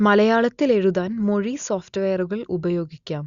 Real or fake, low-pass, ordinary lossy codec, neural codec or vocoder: real; 7.2 kHz; Opus, 64 kbps; none